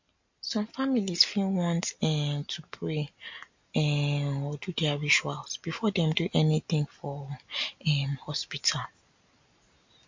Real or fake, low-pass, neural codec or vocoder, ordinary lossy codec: real; 7.2 kHz; none; MP3, 48 kbps